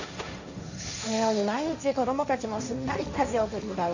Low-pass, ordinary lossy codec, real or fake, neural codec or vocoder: 7.2 kHz; none; fake; codec, 16 kHz, 1.1 kbps, Voila-Tokenizer